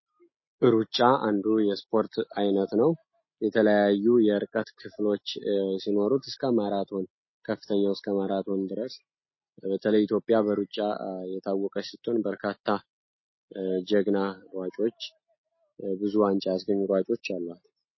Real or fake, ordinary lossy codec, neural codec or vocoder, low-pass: real; MP3, 24 kbps; none; 7.2 kHz